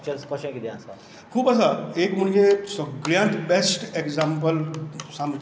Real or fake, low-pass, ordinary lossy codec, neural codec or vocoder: real; none; none; none